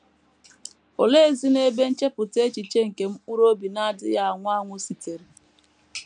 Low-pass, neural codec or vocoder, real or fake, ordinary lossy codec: 9.9 kHz; none; real; none